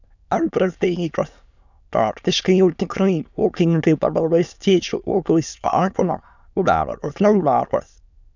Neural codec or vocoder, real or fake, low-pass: autoencoder, 22.05 kHz, a latent of 192 numbers a frame, VITS, trained on many speakers; fake; 7.2 kHz